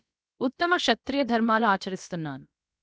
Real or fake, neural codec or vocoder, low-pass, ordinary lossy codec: fake; codec, 16 kHz, about 1 kbps, DyCAST, with the encoder's durations; none; none